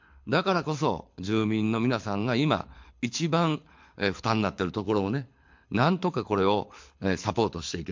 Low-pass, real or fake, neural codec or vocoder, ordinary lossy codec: 7.2 kHz; fake; codec, 24 kHz, 6 kbps, HILCodec; MP3, 48 kbps